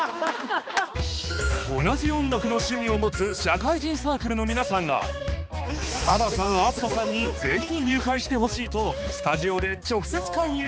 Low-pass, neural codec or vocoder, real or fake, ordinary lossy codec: none; codec, 16 kHz, 2 kbps, X-Codec, HuBERT features, trained on balanced general audio; fake; none